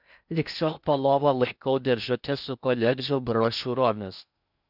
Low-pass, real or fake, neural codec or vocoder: 5.4 kHz; fake; codec, 16 kHz in and 24 kHz out, 0.6 kbps, FocalCodec, streaming, 4096 codes